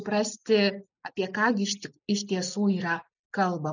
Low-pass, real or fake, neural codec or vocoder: 7.2 kHz; real; none